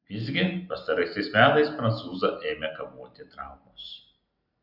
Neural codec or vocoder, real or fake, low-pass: none; real; 5.4 kHz